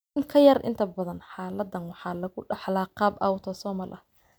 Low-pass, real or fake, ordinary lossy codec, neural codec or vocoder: none; real; none; none